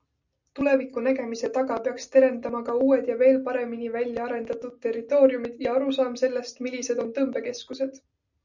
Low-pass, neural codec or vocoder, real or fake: 7.2 kHz; none; real